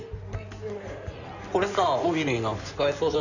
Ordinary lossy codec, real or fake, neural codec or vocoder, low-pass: none; fake; codec, 16 kHz in and 24 kHz out, 2.2 kbps, FireRedTTS-2 codec; 7.2 kHz